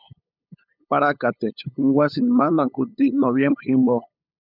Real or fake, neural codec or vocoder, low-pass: fake; codec, 16 kHz, 8 kbps, FunCodec, trained on LibriTTS, 25 frames a second; 5.4 kHz